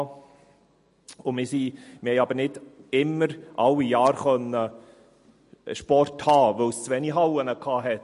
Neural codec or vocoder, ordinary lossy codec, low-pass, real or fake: none; MP3, 48 kbps; 10.8 kHz; real